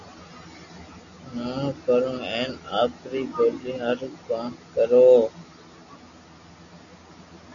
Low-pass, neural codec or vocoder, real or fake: 7.2 kHz; none; real